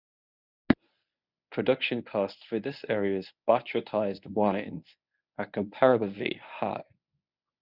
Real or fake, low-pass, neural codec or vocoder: fake; 5.4 kHz; codec, 24 kHz, 0.9 kbps, WavTokenizer, medium speech release version 1